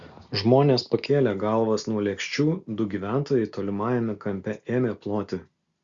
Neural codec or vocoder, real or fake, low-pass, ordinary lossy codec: none; real; 7.2 kHz; Opus, 64 kbps